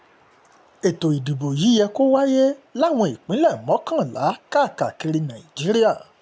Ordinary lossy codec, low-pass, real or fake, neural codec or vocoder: none; none; real; none